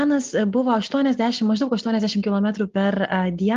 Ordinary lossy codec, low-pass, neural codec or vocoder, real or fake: Opus, 32 kbps; 7.2 kHz; none; real